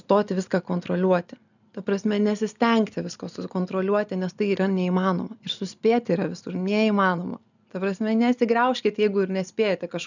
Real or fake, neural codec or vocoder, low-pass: real; none; 7.2 kHz